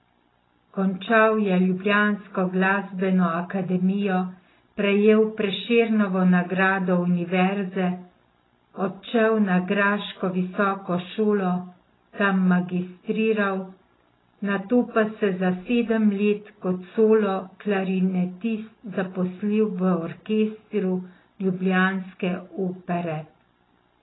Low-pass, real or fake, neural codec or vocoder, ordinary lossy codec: 7.2 kHz; real; none; AAC, 16 kbps